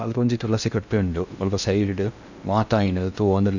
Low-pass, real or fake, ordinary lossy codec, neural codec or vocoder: 7.2 kHz; fake; none; codec, 16 kHz in and 24 kHz out, 0.6 kbps, FocalCodec, streaming, 2048 codes